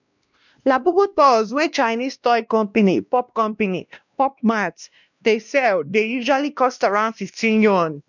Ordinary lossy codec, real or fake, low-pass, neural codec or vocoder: none; fake; 7.2 kHz; codec, 16 kHz, 1 kbps, X-Codec, WavLM features, trained on Multilingual LibriSpeech